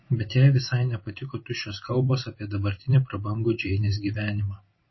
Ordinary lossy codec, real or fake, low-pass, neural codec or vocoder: MP3, 24 kbps; fake; 7.2 kHz; vocoder, 44.1 kHz, 128 mel bands every 512 samples, BigVGAN v2